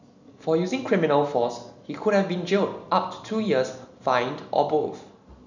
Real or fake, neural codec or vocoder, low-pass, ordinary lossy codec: real; none; 7.2 kHz; none